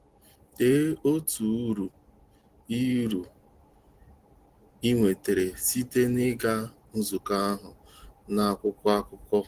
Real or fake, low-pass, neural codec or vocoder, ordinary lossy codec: real; 14.4 kHz; none; Opus, 16 kbps